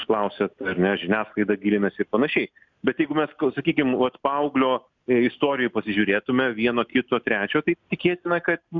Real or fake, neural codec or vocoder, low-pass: real; none; 7.2 kHz